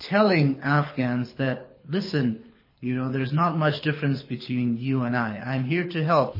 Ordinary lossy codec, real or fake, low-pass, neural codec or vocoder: MP3, 24 kbps; fake; 5.4 kHz; codec, 24 kHz, 6 kbps, HILCodec